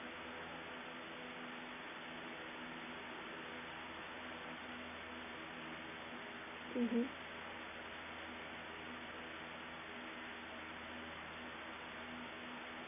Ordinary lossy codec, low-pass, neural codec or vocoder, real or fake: none; 3.6 kHz; none; real